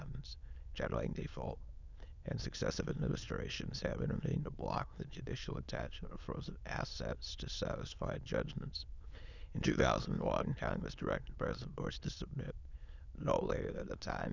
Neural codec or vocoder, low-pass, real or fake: autoencoder, 22.05 kHz, a latent of 192 numbers a frame, VITS, trained on many speakers; 7.2 kHz; fake